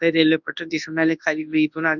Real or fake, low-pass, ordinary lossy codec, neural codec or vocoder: fake; 7.2 kHz; none; codec, 24 kHz, 0.9 kbps, WavTokenizer, large speech release